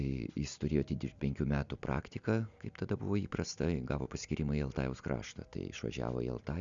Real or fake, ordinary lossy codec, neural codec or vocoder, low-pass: real; Opus, 64 kbps; none; 7.2 kHz